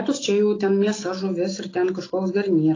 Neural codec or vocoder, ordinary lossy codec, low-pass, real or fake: none; AAC, 32 kbps; 7.2 kHz; real